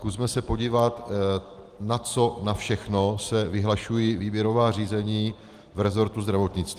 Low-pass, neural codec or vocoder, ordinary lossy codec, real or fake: 14.4 kHz; none; Opus, 24 kbps; real